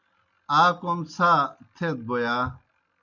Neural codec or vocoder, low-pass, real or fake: none; 7.2 kHz; real